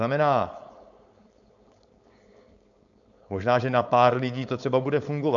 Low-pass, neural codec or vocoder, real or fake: 7.2 kHz; codec, 16 kHz, 4.8 kbps, FACodec; fake